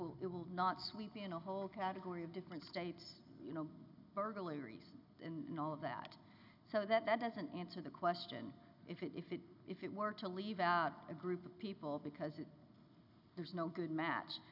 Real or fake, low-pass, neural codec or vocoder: real; 5.4 kHz; none